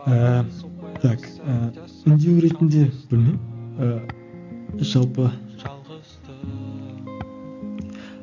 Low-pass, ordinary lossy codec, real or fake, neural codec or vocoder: 7.2 kHz; AAC, 48 kbps; real; none